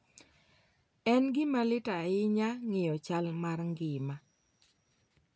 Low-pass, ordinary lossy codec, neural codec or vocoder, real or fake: none; none; none; real